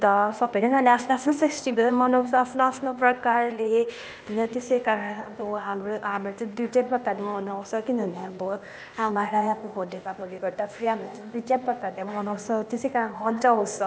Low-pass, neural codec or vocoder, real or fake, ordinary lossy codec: none; codec, 16 kHz, 0.8 kbps, ZipCodec; fake; none